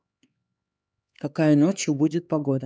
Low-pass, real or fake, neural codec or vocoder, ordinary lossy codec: none; fake; codec, 16 kHz, 4 kbps, X-Codec, HuBERT features, trained on LibriSpeech; none